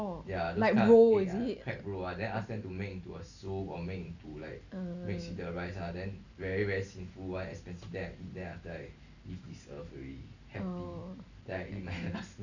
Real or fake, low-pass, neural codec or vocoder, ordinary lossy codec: real; 7.2 kHz; none; none